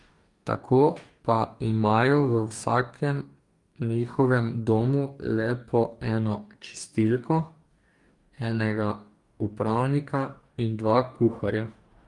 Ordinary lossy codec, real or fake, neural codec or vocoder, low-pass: Opus, 32 kbps; fake; codec, 44.1 kHz, 2.6 kbps, DAC; 10.8 kHz